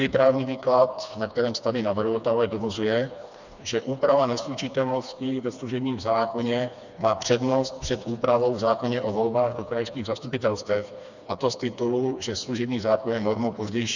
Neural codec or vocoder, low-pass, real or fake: codec, 16 kHz, 2 kbps, FreqCodec, smaller model; 7.2 kHz; fake